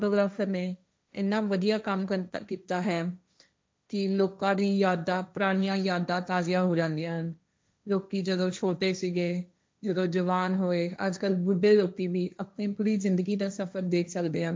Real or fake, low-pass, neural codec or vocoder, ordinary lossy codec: fake; none; codec, 16 kHz, 1.1 kbps, Voila-Tokenizer; none